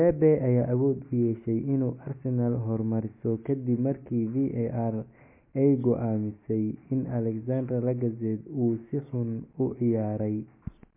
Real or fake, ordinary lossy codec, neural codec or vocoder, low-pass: real; MP3, 24 kbps; none; 3.6 kHz